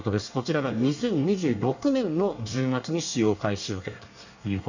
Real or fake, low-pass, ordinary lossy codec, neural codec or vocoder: fake; 7.2 kHz; none; codec, 24 kHz, 1 kbps, SNAC